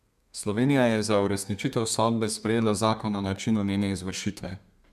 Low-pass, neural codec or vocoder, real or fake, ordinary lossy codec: 14.4 kHz; codec, 32 kHz, 1.9 kbps, SNAC; fake; none